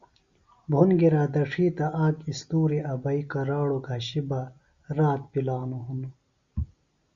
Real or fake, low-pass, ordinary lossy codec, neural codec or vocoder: real; 7.2 kHz; Opus, 64 kbps; none